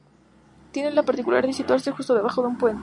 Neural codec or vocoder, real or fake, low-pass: none; real; 10.8 kHz